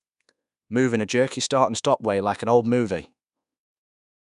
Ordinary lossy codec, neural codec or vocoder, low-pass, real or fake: none; codec, 24 kHz, 1.2 kbps, DualCodec; 10.8 kHz; fake